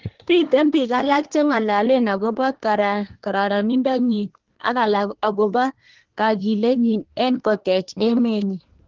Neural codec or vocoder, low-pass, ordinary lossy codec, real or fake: codec, 24 kHz, 1 kbps, SNAC; 7.2 kHz; Opus, 16 kbps; fake